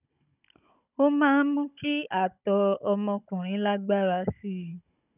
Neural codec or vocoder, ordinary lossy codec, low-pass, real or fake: codec, 16 kHz, 16 kbps, FunCodec, trained on Chinese and English, 50 frames a second; none; 3.6 kHz; fake